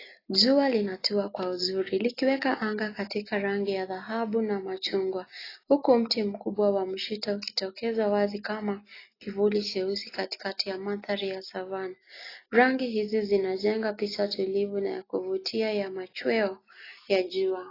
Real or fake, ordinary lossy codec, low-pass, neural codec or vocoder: real; AAC, 24 kbps; 5.4 kHz; none